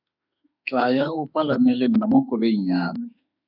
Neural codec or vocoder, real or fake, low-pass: autoencoder, 48 kHz, 32 numbers a frame, DAC-VAE, trained on Japanese speech; fake; 5.4 kHz